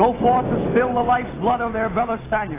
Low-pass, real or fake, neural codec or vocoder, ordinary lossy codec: 3.6 kHz; real; none; AAC, 16 kbps